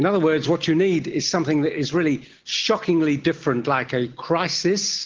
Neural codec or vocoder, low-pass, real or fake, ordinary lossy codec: none; 7.2 kHz; real; Opus, 16 kbps